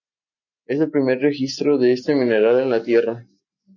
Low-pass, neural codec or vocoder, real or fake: 7.2 kHz; none; real